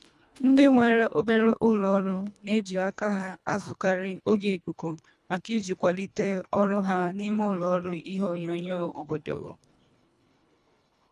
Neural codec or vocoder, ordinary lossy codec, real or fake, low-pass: codec, 24 kHz, 1.5 kbps, HILCodec; none; fake; none